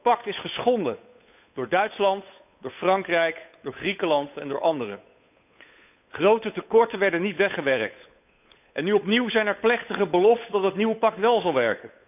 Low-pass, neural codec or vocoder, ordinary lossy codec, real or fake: 3.6 kHz; codec, 16 kHz, 8 kbps, FunCodec, trained on Chinese and English, 25 frames a second; none; fake